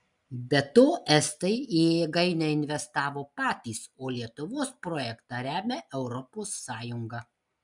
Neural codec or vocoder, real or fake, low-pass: none; real; 10.8 kHz